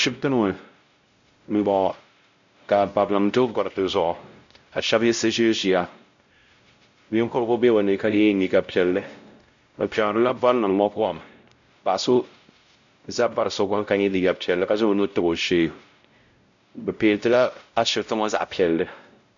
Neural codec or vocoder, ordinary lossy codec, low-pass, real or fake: codec, 16 kHz, 0.5 kbps, X-Codec, WavLM features, trained on Multilingual LibriSpeech; MP3, 64 kbps; 7.2 kHz; fake